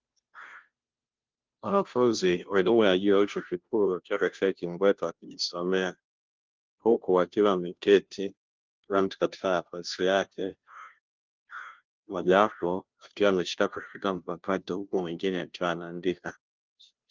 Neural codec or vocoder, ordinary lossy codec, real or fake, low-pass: codec, 16 kHz, 0.5 kbps, FunCodec, trained on Chinese and English, 25 frames a second; Opus, 24 kbps; fake; 7.2 kHz